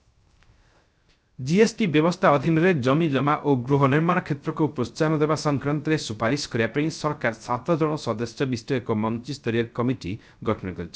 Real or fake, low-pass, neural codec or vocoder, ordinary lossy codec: fake; none; codec, 16 kHz, 0.3 kbps, FocalCodec; none